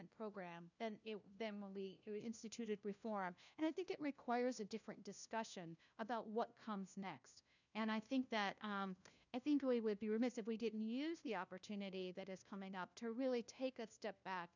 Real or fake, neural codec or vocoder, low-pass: fake; codec, 16 kHz, 1 kbps, FunCodec, trained on LibriTTS, 50 frames a second; 7.2 kHz